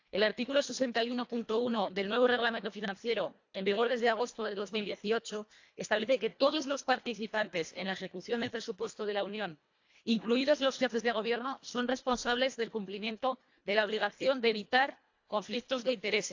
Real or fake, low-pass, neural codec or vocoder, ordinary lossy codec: fake; 7.2 kHz; codec, 24 kHz, 1.5 kbps, HILCodec; AAC, 48 kbps